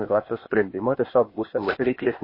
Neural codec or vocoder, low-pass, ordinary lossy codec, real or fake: codec, 16 kHz, 0.8 kbps, ZipCodec; 5.4 kHz; MP3, 24 kbps; fake